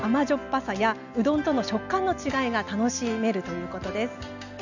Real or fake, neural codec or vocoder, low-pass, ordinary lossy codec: real; none; 7.2 kHz; none